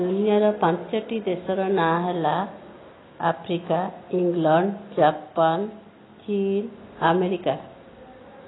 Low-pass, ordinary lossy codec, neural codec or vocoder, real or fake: 7.2 kHz; AAC, 16 kbps; none; real